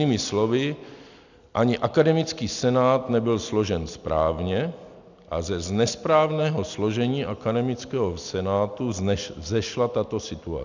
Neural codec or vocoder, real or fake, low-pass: none; real; 7.2 kHz